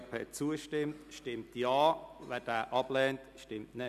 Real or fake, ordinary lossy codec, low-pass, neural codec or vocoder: real; none; 14.4 kHz; none